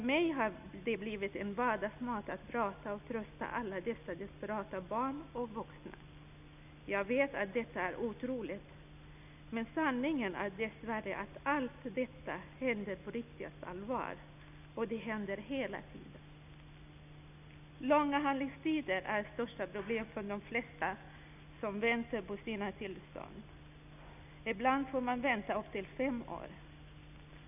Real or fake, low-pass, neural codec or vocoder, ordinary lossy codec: real; 3.6 kHz; none; none